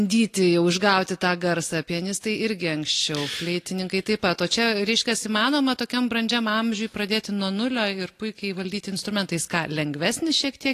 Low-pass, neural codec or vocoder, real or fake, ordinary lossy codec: 14.4 kHz; none; real; AAC, 48 kbps